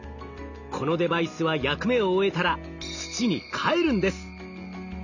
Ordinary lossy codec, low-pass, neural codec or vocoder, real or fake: none; 7.2 kHz; none; real